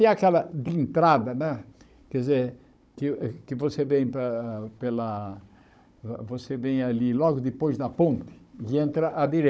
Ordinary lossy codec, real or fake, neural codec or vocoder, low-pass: none; fake; codec, 16 kHz, 16 kbps, FunCodec, trained on Chinese and English, 50 frames a second; none